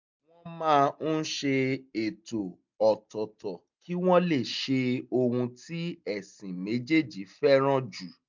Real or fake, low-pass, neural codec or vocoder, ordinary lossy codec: real; 7.2 kHz; none; MP3, 64 kbps